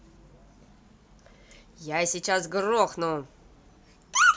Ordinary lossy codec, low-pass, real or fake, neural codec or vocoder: none; none; real; none